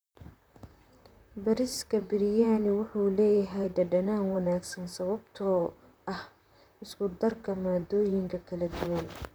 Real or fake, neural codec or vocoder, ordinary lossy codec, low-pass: fake; vocoder, 44.1 kHz, 128 mel bands, Pupu-Vocoder; none; none